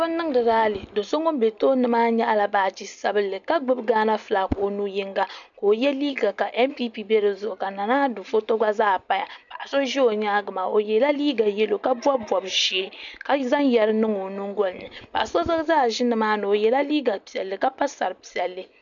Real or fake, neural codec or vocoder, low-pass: real; none; 7.2 kHz